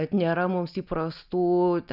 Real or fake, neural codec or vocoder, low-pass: real; none; 5.4 kHz